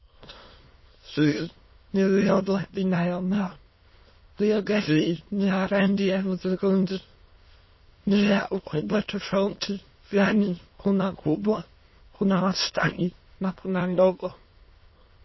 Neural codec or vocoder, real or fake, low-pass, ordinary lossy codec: autoencoder, 22.05 kHz, a latent of 192 numbers a frame, VITS, trained on many speakers; fake; 7.2 kHz; MP3, 24 kbps